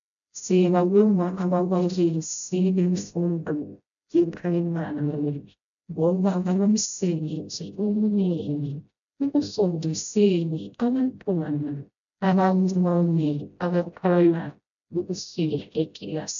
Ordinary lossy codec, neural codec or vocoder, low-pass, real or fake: MP3, 64 kbps; codec, 16 kHz, 0.5 kbps, FreqCodec, smaller model; 7.2 kHz; fake